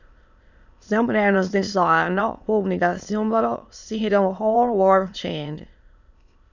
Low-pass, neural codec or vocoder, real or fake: 7.2 kHz; autoencoder, 22.05 kHz, a latent of 192 numbers a frame, VITS, trained on many speakers; fake